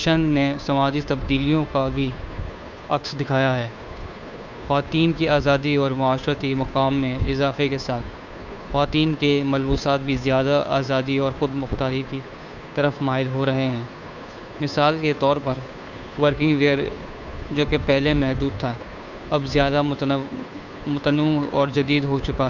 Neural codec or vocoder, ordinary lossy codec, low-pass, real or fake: codec, 16 kHz, 2 kbps, FunCodec, trained on Chinese and English, 25 frames a second; none; 7.2 kHz; fake